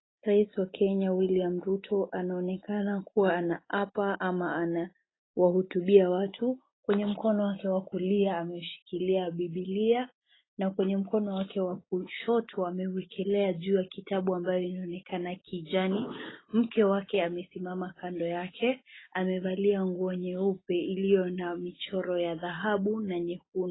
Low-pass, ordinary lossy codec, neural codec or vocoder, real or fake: 7.2 kHz; AAC, 16 kbps; none; real